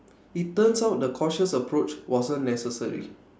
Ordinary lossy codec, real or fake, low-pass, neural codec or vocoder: none; real; none; none